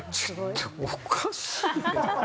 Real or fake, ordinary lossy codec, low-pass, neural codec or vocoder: real; none; none; none